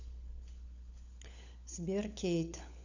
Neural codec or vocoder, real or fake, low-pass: codec, 16 kHz, 16 kbps, FunCodec, trained on LibriTTS, 50 frames a second; fake; 7.2 kHz